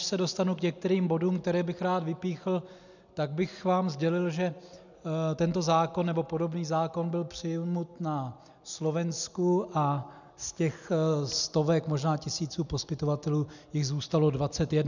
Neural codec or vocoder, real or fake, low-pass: none; real; 7.2 kHz